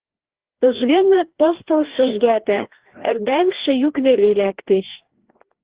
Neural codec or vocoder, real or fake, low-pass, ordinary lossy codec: codec, 16 kHz, 1 kbps, FreqCodec, larger model; fake; 3.6 kHz; Opus, 16 kbps